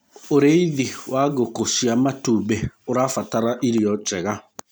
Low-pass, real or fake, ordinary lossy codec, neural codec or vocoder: none; real; none; none